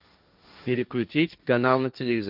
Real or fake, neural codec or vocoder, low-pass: fake; codec, 16 kHz, 1.1 kbps, Voila-Tokenizer; 5.4 kHz